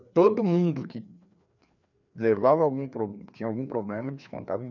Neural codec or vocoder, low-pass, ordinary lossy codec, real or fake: codec, 16 kHz, 2 kbps, FreqCodec, larger model; 7.2 kHz; none; fake